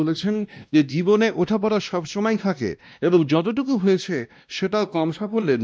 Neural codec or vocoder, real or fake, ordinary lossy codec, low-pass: codec, 16 kHz, 1 kbps, X-Codec, WavLM features, trained on Multilingual LibriSpeech; fake; none; none